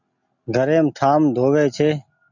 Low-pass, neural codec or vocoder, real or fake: 7.2 kHz; none; real